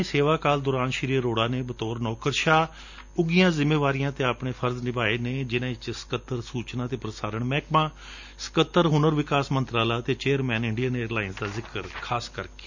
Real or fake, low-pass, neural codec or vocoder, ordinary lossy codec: real; 7.2 kHz; none; none